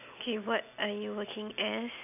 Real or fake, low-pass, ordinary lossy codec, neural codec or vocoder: real; 3.6 kHz; none; none